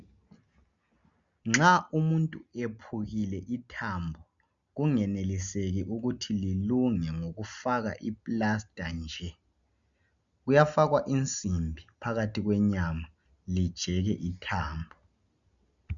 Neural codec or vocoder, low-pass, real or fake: none; 7.2 kHz; real